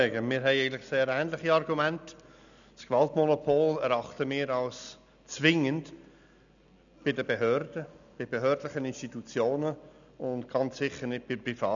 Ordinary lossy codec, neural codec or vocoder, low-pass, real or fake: none; none; 7.2 kHz; real